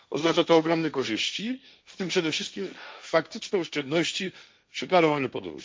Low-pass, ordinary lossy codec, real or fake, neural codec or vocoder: none; none; fake; codec, 16 kHz, 1.1 kbps, Voila-Tokenizer